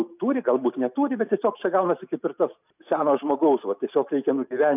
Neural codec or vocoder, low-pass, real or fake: none; 3.6 kHz; real